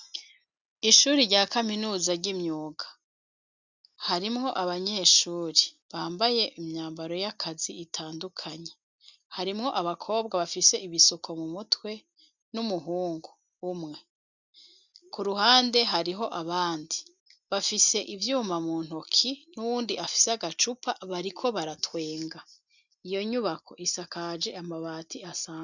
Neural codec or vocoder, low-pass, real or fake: none; 7.2 kHz; real